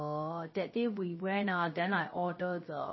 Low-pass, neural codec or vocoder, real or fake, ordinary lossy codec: 7.2 kHz; codec, 16 kHz, 0.3 kbps, FocalCodec; fake; MP3, 24 kbps